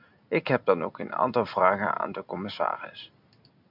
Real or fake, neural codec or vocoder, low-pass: real; none; 5.4 kHz